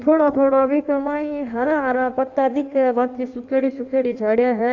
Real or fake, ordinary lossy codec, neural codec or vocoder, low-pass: fake; none; codec, 16 kHz in and 24 kHz out, 1.1 kbps, FireRedTTS-2 codec; 7.2 kHz